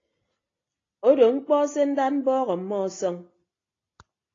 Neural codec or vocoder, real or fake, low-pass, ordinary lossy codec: none; real; 7.2 kHz; AAC, 32 kbps